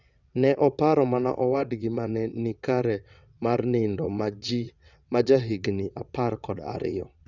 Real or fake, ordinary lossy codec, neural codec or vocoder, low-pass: fake; none; vocoder, 22.05 kHz, 80 mel bands, WaveNeXt; 7.2 kHz